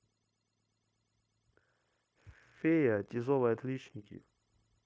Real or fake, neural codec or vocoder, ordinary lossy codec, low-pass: fake; codec, 16 kHz, 0.9 kbps, LongCat-Audio-Codec; none; none